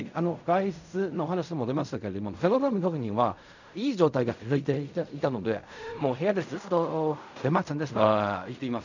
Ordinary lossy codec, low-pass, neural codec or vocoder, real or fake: none; 7.2 kHz; codec, 16 kHz in and 24 kHz out, 0.4 kbps, LongCat-Audio-Codec, fine tuned four codebook decoder; fake